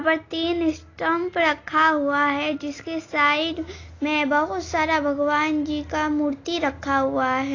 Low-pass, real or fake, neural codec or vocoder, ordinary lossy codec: 7.2 kHz; real; none; AAC, 32 kbps